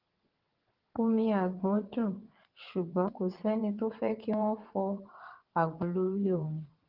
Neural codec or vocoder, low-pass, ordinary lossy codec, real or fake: vocoder, 22.05 kHz, 80 mel bands, WaveNeXt; 5.4 kHz; Opus, 16 kbps; fake